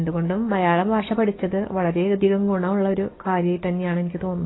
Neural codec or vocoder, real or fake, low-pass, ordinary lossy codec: codec, 44.1 kHz, 7.8 kbps, DAC; fake; 7.2 kHz; AAC, 16 kbps